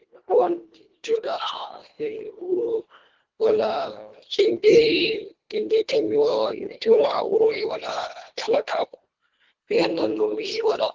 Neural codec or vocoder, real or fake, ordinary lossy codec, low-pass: codec, 24 kHz, 1.5 kbps, HILCodec; fake; Opus, 16 kbps; 7.2 kHz